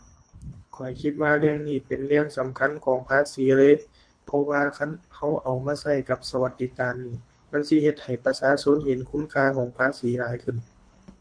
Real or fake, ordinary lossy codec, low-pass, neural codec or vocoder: fake; MP3, 48 kbps; 9.9 kHz; codec, 24 kHz, 3 kbps, HILCodec